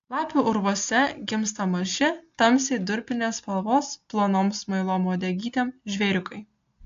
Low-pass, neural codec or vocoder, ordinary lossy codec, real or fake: 7.2 kHz; none; AAC, 64 kbps; real